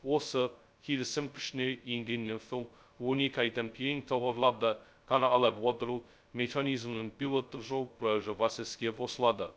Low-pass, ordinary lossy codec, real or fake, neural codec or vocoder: none; none; fake; codec, 16 kHz, 0.2 kbps, FocalCodec